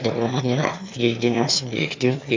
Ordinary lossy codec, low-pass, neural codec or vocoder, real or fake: MP3, 64 kbps; 7.2 kHz; autoencoder, 22.05 kHz, a latent of 192 numbers a frame, VITS, trained on one speaker; fake